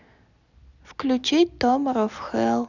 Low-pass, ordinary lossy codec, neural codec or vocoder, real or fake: 7.2 kHz; none; none; real